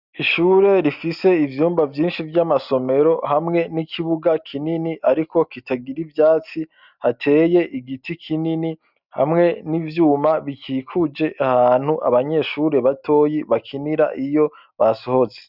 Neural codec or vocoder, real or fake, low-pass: none; real; 5.4 kHz